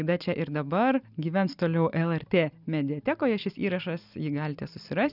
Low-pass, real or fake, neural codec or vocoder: 5.4 kHz; real; none